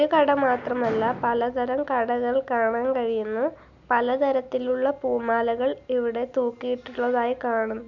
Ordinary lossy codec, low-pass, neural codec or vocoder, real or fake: none; 7.2 kHz; none; real